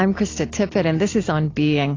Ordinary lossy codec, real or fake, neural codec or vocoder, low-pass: AAC, 32 kbps; real; none; 7.2 kHz